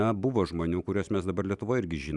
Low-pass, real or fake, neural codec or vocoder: 10.8 kHz; real; none